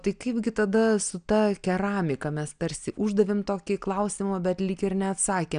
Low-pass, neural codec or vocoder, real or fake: 9.9 kHz; none; real